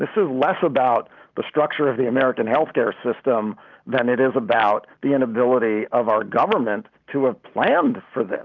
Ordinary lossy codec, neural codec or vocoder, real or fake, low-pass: Opus, 24 kbps; none; real; 7.2 kHz